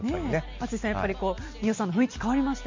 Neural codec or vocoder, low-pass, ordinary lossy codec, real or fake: none; 7.2 kHz; MP3, 64 kbps; real